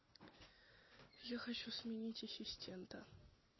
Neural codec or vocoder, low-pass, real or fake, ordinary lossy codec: none; 7.2 kHz; real; MP3, 24 kbps